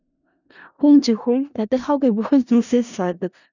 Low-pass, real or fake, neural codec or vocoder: 7.2 kHz; fake; codec, 16 kHz in and 24 kHz out, 0.4 kbps, LongCat-Audio-Codec, four codebook decoder